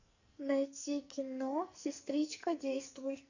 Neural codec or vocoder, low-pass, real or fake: codec, 44.1 kHz, 2.6 kbps, SNAC; 7.2 kHz; fake